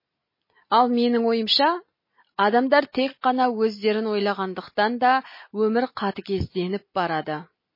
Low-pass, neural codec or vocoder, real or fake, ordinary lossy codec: 5.4 kHz; none; real; MP3, 24 kbps